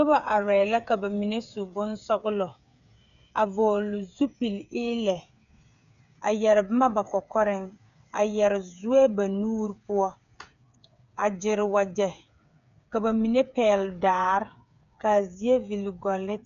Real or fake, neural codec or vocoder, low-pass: fake; codec, 16 kHz, 8 kbps, FreqCodec, smaller model; 7.2 kHz